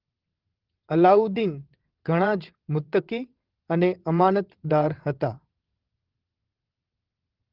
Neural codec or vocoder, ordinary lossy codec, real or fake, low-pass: vocoder, 22.05 kHz, 80 mel bands, WaveNeXt; Opus, 16 kbps; fake; 5.4 kHz